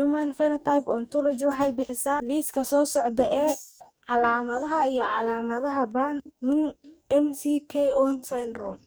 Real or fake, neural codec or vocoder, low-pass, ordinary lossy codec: fake; codec, 44.1 kHz, 2.6 kbps, DAC; none; none